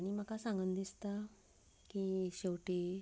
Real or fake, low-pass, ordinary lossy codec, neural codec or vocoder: real; none; none; none